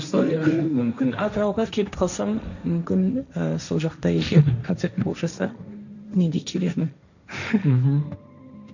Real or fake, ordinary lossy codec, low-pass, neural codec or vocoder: fake; none; 7.2 kHz; codec, 16 kHz, 1.1 kbps, Voila-Tokenizer